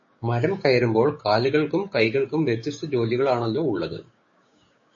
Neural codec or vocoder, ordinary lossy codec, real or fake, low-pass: codec, 16 kHz, 6 kbps, DAC; MP3, 32 kbps; fake; 7.2 kHz